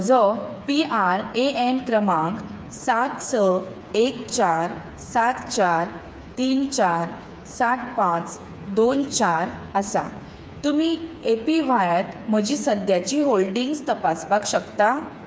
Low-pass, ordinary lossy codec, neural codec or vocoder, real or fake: none; none; codec, 16 kHz, 4 kbps, FreqCodec, smaller model; fake